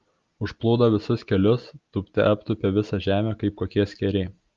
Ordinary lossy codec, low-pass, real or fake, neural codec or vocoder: Opus, 32 kbps; 7.2 kHz; real; none